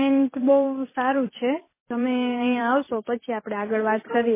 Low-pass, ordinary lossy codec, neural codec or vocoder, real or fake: 3.6 kHz; MP3, 16 kbps; vocoder, 44.1 kHz, 128 mel bands every 256 samples, BigVGAN v2; fake